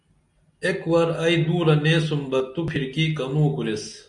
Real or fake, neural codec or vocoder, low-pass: real; none; 10.8 kHz